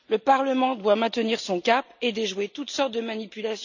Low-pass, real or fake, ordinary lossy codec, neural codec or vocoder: 7.2 kHz; real; none; none